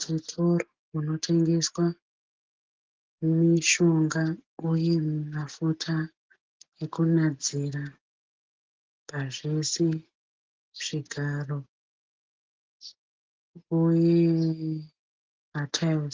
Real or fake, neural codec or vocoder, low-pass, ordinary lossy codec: real; none; 7.2 kHz; Opus, 16 kbps